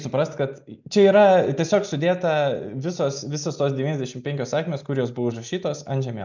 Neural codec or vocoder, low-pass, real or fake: none; 7.2 kHz; real